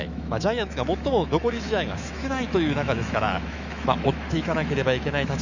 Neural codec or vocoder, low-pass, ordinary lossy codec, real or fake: autoencoder, 48 kHz, 128 numbers a frame, DAC-VAE, trained on Japanese speech; 7.2 kHz; none; fake